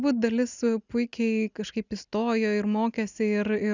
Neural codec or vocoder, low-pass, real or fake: none; 7.2 kHz; real